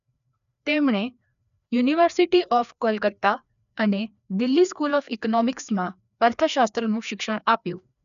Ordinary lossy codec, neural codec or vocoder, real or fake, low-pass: none; codec, 16 kHz, 2 kbps, FreqCodec, larger model; fake; 7.2 kHz